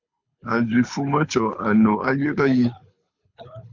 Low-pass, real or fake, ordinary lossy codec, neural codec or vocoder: 7.2 kHz; fake; MP3, 48 kbps; codec, 24 kHz, 6 kbps, HILCodec